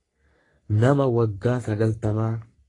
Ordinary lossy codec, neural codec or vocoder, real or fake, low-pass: AAC, 32 kbps; codec, 44.1 kHz, 3.4 kbps, Pupu-Codec; fake; 10.8 kHz